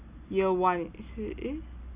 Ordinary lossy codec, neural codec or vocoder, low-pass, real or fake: Opus, 64 kbps; none; 3.6 kHz; real